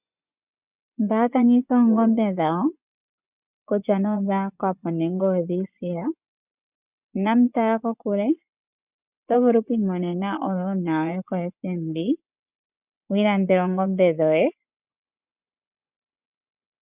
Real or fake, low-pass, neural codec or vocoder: fake; 3.6 kHz; vocoder, 24 kHz, 100 mel bands, Vocos